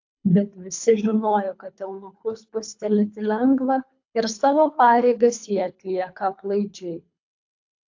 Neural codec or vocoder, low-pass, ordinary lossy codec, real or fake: codec, 24 kHz, 3 kbps, HILCodec; 7.2 kHz; AAC, 48 kbps; fake